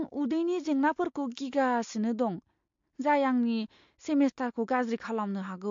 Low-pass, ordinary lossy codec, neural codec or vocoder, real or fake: 7.2 kHz; MP3, 48 kbps; none; real